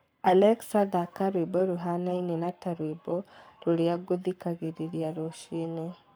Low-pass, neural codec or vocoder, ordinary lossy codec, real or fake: none; codec, 44.1 kHz, 7.8 kbps, Pupu-Codec; none; fake